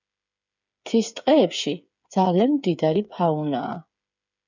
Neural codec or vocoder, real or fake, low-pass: codec, 16 kHz, 8 kbps, FreqCodec, smaller model; fake; 7.2 kHz